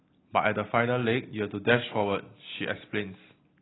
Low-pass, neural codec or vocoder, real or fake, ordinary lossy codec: 7.2 kHz; none; real; AAC, 16 kbps